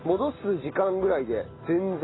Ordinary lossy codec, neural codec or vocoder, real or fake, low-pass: AAC, 16 kbps; none; real; 7.2 kHz